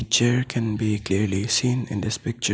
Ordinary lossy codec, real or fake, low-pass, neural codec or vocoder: none; real; none; none